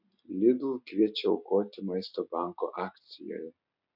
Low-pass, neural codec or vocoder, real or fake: 5.4 kHz; none; real